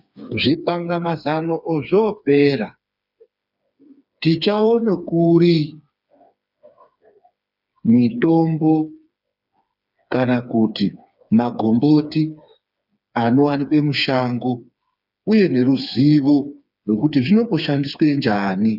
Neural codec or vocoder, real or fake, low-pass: codec, 16 kHz, 4 kbps, FreqCodec, smaller model; fake; 5.4 kHz